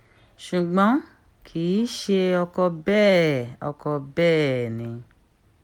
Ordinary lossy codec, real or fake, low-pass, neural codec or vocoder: MP3, 96 kbps; real; 19.8 kHz; none